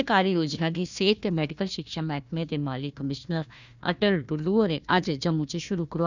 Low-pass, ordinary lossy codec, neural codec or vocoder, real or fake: 7.2 kHz; none; codec, 16 kHz, 1 kbps, FunCodec, trained on Chinese and English, 50 frames a second; fake